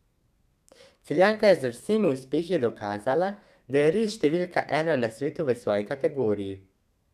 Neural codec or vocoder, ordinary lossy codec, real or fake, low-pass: codec, 32 kHz, 1.9 kbps, SNAC; none; fake; 14.4 kHz